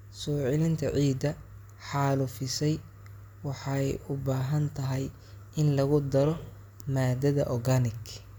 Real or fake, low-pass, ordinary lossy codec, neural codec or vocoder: real; none; none; none